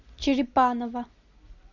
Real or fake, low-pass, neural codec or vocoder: real; 7.2 kHz; none